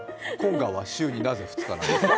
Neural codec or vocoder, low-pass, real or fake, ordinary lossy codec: none; none; real; none